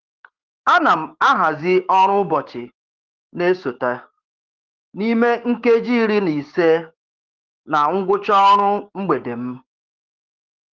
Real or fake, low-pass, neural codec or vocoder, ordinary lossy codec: fake; 7.2 kHz; codec, 16 kHz, 6 kbps, DAC; Opus, 16 kbps